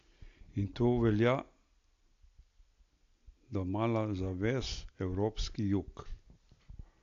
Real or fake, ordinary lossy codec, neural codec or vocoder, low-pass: real; AAC, 48 kbps; none; 7.2 kHz